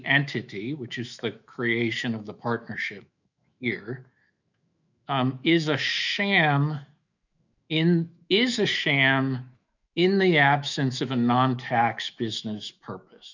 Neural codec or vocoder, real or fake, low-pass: autoencoder, 48 kHz, 128 numbers a frame, DAC-VAE, trained on Japanese speech; fake; 7.2 kHz